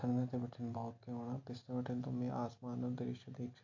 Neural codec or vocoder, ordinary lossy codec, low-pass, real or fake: none; MP3, 48 kbps; 7.2 kHz; real